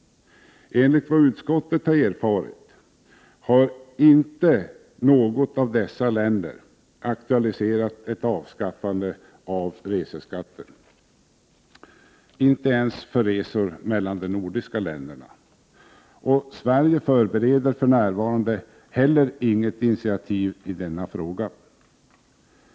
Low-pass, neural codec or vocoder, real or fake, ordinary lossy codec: none; none; real; none